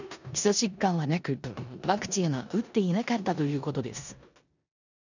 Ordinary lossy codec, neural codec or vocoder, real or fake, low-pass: none; codec, 16 kHz in and 24 kHz out, 0.9 kbps, LongCat-Audio-Codec, four codebook decoder; fake; 7.2 kHz